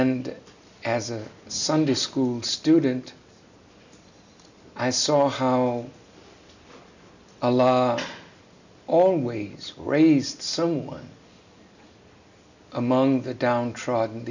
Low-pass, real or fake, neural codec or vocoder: 7.2 kHz; real; none